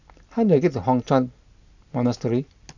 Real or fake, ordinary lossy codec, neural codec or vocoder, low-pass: real; none; none; 7.2 kHz